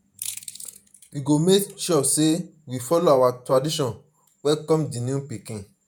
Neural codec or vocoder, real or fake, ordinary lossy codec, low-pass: vocoder, 48 kHz, 128 mel bands, Vocos; fake; none; none